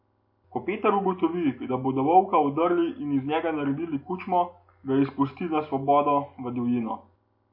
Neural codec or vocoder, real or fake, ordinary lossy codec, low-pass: none; real; MP3, 32 kbps; 5.4 kHz